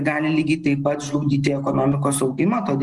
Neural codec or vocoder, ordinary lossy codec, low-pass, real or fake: none; Opus, 24 kbps; 10.8 kHz; real